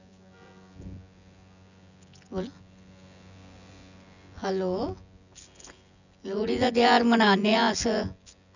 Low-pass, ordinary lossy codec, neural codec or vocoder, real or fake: 7.2 kHz; none; vocoder, 24 kHz, 100 mel bands, Vocos; fake